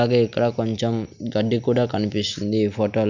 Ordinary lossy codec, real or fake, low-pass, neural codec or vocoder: none; real; 7.2 kHz; none